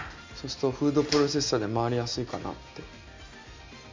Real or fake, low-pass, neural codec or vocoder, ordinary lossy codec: real; 7.2 kHz; none; MP3, 64 kbps